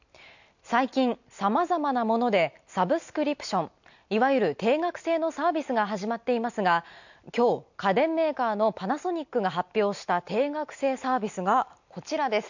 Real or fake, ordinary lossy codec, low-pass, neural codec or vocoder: real; none; 7.2 kHz; none